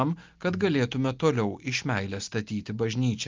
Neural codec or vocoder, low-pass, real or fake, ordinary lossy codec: none; 7.2 kHz; real; Opus, 32 kbps